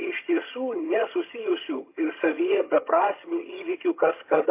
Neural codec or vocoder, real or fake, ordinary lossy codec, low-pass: vocoder, 22.05 kHz, 80 mel bands, HiFi-GAN; fake; MP3, 24 kbps; 3.6 kHz